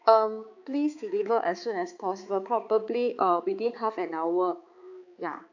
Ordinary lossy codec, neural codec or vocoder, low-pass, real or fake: AAC, 48 kbps; codec, 16 kHz, 4 kbps, X-Codec, HuBERT features, trained on balanced general audio; 7.2 kHz; fake